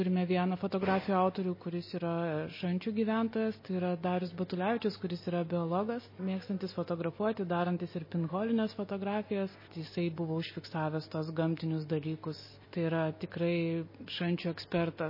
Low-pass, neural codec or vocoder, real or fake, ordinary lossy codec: 5.4 kHz; none; real; MP3, 24 kbps